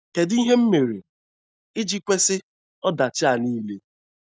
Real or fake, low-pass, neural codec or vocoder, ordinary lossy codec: real; none; none; none